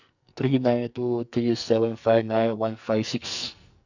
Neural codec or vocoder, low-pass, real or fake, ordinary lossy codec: codec, 44.1 kHz, 2.6 kbps, SNAC; 7.2 kHz; fake; none